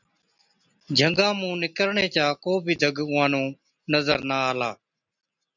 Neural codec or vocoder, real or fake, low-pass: none; real; 7.2 kHz